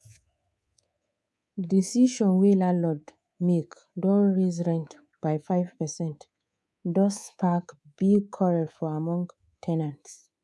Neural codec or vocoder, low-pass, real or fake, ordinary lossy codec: codec, 24 kHz, 3.1 kbps, DualCodec; none; fake; none